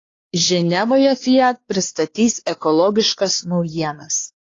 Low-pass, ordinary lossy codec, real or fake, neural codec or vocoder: 7.2 kHz; AAC, 32 kbps; fake; codec, 16 kHz, 2 kbps, X-Codec, WavLM features, trained on Multilingual LibriSpeech